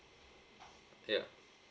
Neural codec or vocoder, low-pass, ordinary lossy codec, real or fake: none; none; none; real